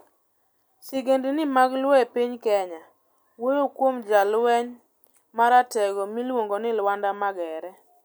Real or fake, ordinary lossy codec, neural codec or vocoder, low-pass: real; none; none; none